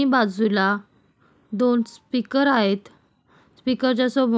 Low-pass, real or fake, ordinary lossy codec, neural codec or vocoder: none; real; none; none